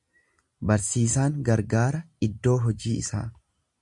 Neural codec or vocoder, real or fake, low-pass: none; real; 10.8 kHz